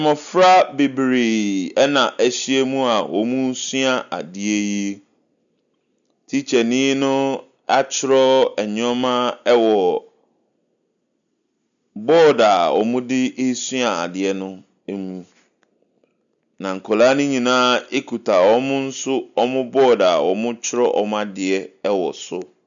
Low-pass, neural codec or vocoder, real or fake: 7.2 kHz; none; real